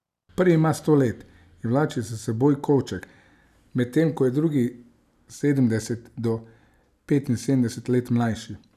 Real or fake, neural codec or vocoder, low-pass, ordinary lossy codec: real; none; 14.4 kHz; none